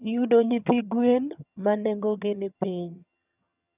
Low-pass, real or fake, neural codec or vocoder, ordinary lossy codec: 3.6 kHz; fake; vocoder, 22.05 kHz, 80 mel bands, HiFi-GAN; none